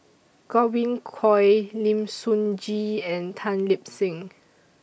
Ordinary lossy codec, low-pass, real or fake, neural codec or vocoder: none; none; real; none